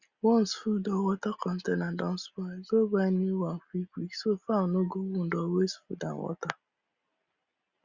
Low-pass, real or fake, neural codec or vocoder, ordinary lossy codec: 7.2 kHz; real; none; Opus, 64 kbps